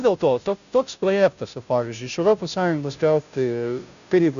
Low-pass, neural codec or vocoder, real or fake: 7.2 kHz; codec, 16 kHz, 0.5 kbps, FunCodec, trained on Chinese and English, 25 frames a second; fake